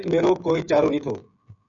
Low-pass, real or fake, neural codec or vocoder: 7.2 kHz; fake; codec, 16 kHz, 16 kbps, FreqCodec, smaller model